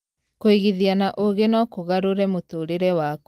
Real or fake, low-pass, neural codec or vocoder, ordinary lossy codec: real; 14.4 kHz; none; Opus, 32 kbps